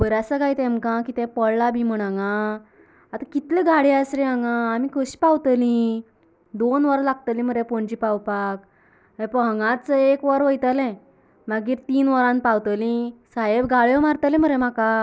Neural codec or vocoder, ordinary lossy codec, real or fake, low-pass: none; none; real; none